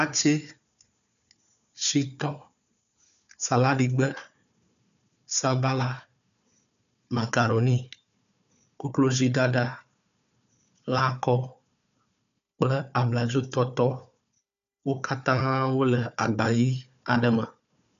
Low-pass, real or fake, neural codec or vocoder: 7.2 kHz; fake; codec, 16 kHz, 4 kbps, FunCodec, trained on Chinese and English, 50 frames a second